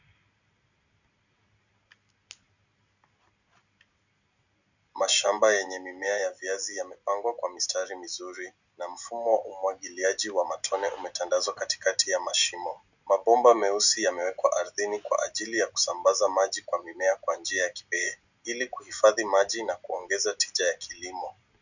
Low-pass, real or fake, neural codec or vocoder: 7.2 kHz; real; none